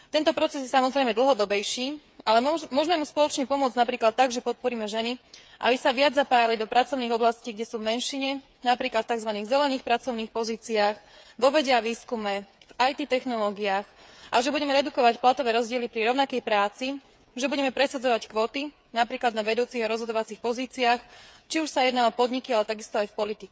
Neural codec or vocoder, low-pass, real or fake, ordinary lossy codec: codec, 16 kHz, 8 kbps, FreqCodec, smaller model; none; fake; none